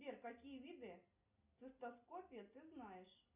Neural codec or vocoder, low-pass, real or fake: none; 3.6 kHz; real